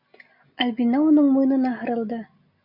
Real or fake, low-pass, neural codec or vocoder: real; 5.4 kHz; none